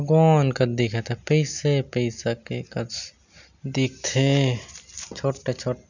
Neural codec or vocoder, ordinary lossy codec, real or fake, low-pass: none; none; real; 7.2 kHz